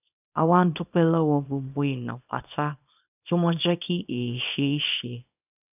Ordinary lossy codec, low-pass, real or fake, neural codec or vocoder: none; 3.6 kHz; fake; codec, 24 kHz, 0.9 kbps, WavTokenizer, small release